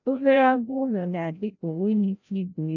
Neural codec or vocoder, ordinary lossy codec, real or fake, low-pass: codec, 16 kHz, 0.5 kbps, FreqCodec, larger model; none; fake; 7.2 kHz